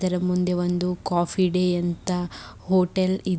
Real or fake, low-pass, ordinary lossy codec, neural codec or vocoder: real; none; none; none